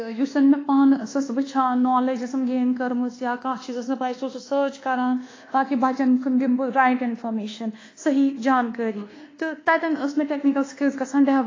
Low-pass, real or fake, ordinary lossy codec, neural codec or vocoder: 7.2 kHz; fake; AAC, 32 kbps; codec, 24 kHz, 1.2 kbps, DualCodec